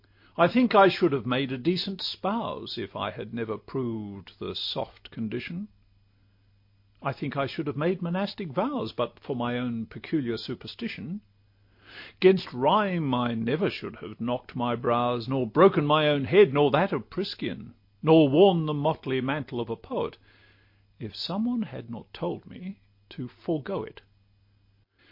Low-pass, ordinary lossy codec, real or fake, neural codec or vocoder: 5.4 kHz; MP3, 32 kbps; real; none